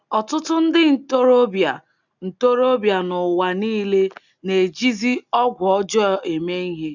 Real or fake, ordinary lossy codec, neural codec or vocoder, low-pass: real; none; none; 7.2 kHz